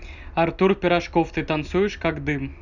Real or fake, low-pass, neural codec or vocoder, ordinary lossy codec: real; 7.2 kHz; none; none